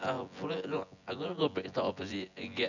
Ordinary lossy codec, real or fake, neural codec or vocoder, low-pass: none; fake; vocoder, 24 kHz, 100 mel bands, Vocos; 7.2 kHz